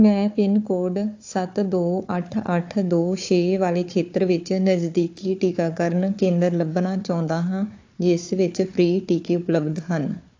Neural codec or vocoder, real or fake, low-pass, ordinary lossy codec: codec, 16 kHz, 4 kbps, FunCodec, trained on Chinese and English, 50 frames a second; fake; 7.2 kHz; AAC, 48 kbps